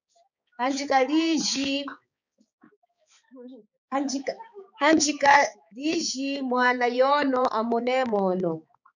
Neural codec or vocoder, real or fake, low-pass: codec, 16 kHz, 4 kbps, X-Codec, HuBERT features, trained on balanced general audio; fake; 7.2 kHz